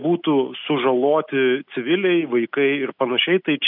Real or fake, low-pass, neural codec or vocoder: real; 5.4 kHz; none